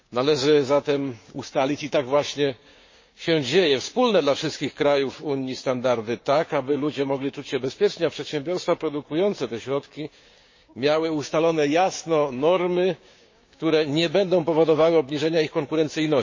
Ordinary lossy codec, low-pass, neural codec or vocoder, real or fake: MP3, 32 kbps; 7.2 kHz; codec, 16 kHz, 6 kbps, DAC; fake